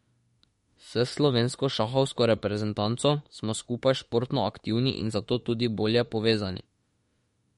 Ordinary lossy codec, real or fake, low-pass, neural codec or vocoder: MP3, 48 kbps; fake; 19.8 kHz; autoencoder, 48 kHz, 32 numbers a frame, DAC-VAE, trained on Japanese speech